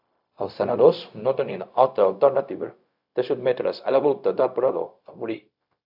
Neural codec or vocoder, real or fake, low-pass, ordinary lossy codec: codec, 16 kHz, 0.4 kbps, LongCat-Audio-Codec; fake; 5.4 kHz; AAC, 48 kbps